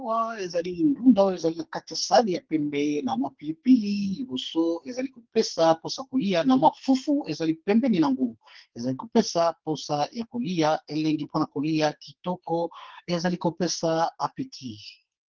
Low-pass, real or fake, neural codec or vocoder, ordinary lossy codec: 7.2 kHz; fake; codec, 44.1 kHz, 2.6 kbps, SNAC; Opus, 16 kbps